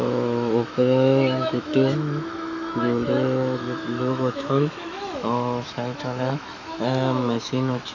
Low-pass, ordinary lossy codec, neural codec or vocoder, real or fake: 7.2 kHz; none; autoencoder, 48 kHz, 128 numbers a frame, DAC-VAE, trained on Japanese speech; fake